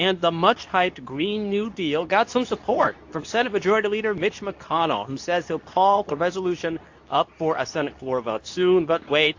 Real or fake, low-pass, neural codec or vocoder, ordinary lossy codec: fake; 7.2 kHz; codec, 24 kHz, 0.9 kbps, WavTokenizer, medium speech release version 2; AAC, 48 kbps